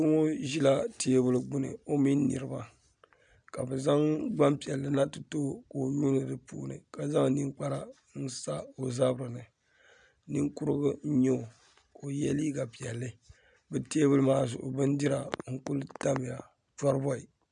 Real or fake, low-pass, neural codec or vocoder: real; 9.9 kHz; none